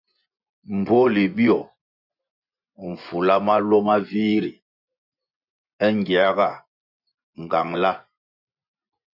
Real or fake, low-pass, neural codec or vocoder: fake; 5.4 kHz; vocoder, 24 kHz, 100 mel bands, Vocos